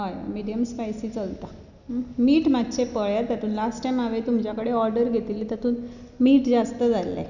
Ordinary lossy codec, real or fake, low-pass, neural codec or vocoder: none; real; 7.2 kHz; none